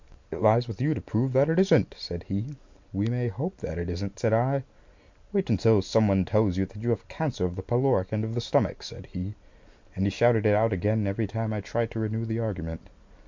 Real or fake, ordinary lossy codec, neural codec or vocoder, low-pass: real; MP3, 64 kbps; none; 7.2 kHz